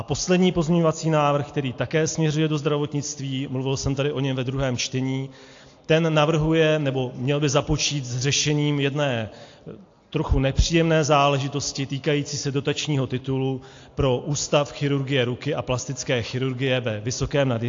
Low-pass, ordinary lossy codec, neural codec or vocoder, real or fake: 7.2 kHz; AAC, 48 kbps; none; real